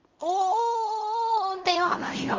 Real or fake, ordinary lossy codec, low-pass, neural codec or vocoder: fake; Opus, 32 kbps; 7.2 kHz; codec, 16 kHz in and 24 kHz out, 0.4 kbps, LongCat-Audio-Codec, fine tuned four codebook decoder